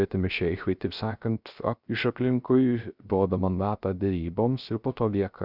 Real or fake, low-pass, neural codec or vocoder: fake; 5.4 kHz; codec, 16 kHz, 0.3 kbps, FocalCodec